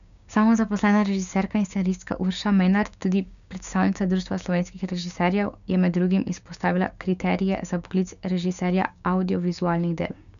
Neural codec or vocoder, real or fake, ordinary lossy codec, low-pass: codec, 16 kHz, 6 kbps, DAC; fake; none; 7.2 kHz